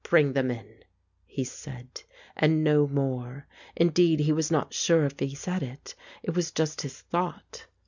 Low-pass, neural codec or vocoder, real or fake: 7.2 kHz; none; real